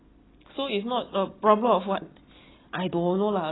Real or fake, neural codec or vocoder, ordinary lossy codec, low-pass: real; none; AAC, 16 kbps; 7.2 kHz